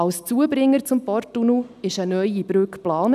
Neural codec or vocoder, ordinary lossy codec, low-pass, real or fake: none; none; 14.4 kHz; real